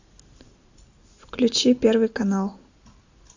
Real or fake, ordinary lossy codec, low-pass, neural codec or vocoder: real; AAC, 48 kbps; 7.2 kHz; none